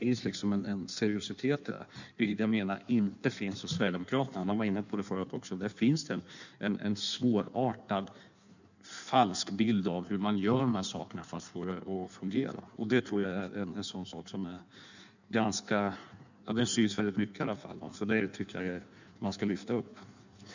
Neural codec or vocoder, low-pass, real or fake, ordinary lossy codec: codec, 16 kHz in and 24 kHz out, 1.1 kbps, FireRedTTS-2 codec; 7.2 kHz; fake; none